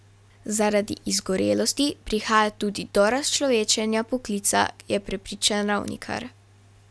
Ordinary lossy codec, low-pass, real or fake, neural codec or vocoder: none; none; real; none